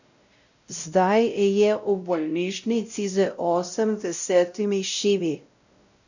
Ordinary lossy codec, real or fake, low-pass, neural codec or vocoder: none; fake; 7.2 kHz; codec, 16 kHz, 0.5 kbps, X-Codec, WavLM features, trained on Multilingual LibriSpeech